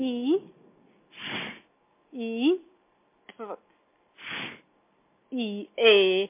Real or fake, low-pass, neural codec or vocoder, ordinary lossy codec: real; 3.6 kHz; none; MP3, 24 kbps